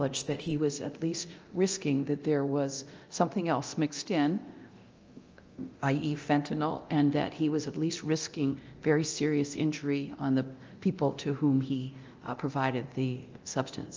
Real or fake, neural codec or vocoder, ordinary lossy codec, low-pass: fake; codec, 24 kHz, 0.9 kbps, DualCodec; Opus, 24 kbps; 7.2 kHz